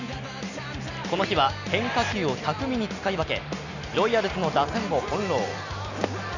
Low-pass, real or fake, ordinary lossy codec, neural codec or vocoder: 7.2 kHz; real; none; none